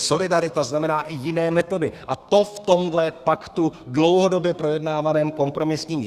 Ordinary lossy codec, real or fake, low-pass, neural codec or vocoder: Opus, 64 kbps; fake; 14.4 kHz; codec, 32 kHz, 1.9 kbps, SNAC